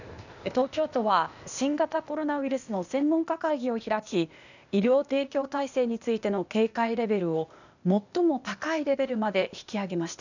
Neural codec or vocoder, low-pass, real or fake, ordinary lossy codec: codec, 16 kHz, 0.8 kbps, ZipCodec; 7.2 kHz; fake; none